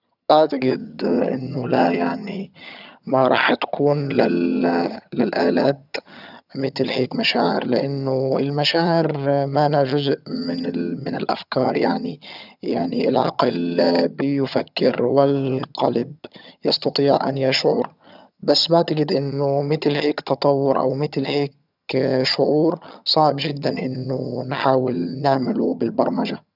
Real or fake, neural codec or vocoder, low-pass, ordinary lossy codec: fake; vocoder, 22.05 kHz, 80 mel bands, HiFi-GAN; 5.4 kHz; none